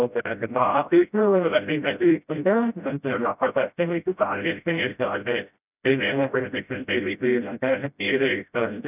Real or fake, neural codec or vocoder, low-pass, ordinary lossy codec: fake; codec, 16 kHz, 0.5 kbps, FreqCodec, smaller model; 3.6 kHz; none